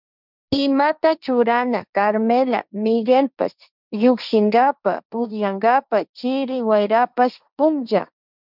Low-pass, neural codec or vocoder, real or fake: 5.4 kHz; codec, 16 kHz, 1.1 kbps, Voila-Tokenizer; fake